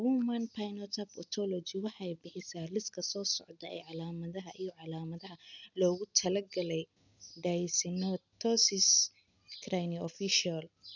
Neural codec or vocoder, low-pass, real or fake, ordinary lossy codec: none; 7.2 kHz; real; none